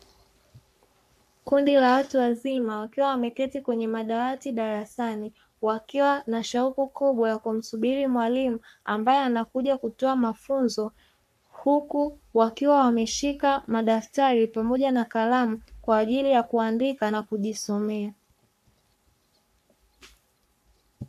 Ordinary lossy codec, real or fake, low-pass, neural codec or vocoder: AAC, 96 kbps; fake; 14.4 kHz; codec, 44.1 kHz, 3.4 kbps, Pupu-Codec